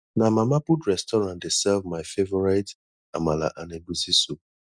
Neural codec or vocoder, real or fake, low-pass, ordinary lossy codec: none; real; 9.9 kHz; none